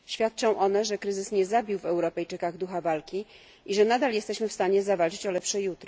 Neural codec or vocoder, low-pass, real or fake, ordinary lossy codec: none; none; real; none